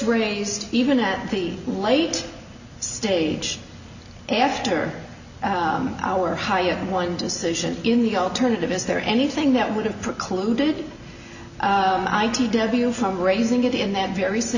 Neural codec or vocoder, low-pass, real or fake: none; 7.2 kHz; real